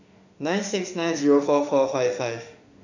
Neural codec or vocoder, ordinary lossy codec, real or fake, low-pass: autoencoder, 48 kHz, 32 numbers a frame, DAC-VAE, trained on Japanese speech; none; fake; 7.2 kHz